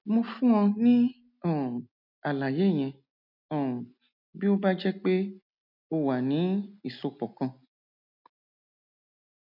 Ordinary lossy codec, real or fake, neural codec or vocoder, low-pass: none; real; none; 5.4 kHz